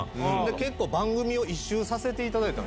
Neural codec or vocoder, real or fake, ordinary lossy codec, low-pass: none; real; none; none